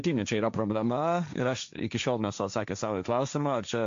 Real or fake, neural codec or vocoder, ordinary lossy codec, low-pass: fake; codec, 16 kHz, 1.1 kbps, Voila-Tokenizer; MP3, 64 kbps; 7.2 kHz